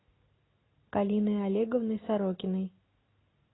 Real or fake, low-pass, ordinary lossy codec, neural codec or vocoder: real; 7.2 kHz; AAC, 16 kbps; none